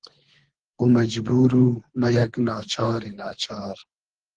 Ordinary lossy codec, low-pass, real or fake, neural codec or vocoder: Opus, 16 kbps; 9.9 kHz; fake; codec, 24 kHz, 3 kbps, HILCodec